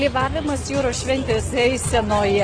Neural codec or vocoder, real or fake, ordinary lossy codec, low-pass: none; real; Opus, 16 kbps; 9.9 kHz